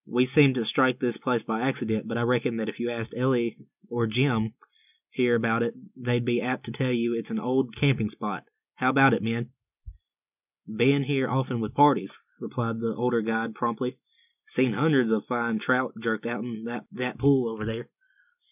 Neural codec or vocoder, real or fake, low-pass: none; real; 3.6 kHz